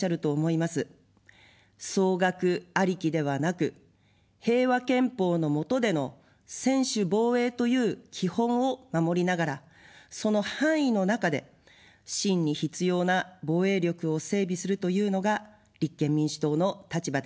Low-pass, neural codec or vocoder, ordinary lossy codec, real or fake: none; none; none; real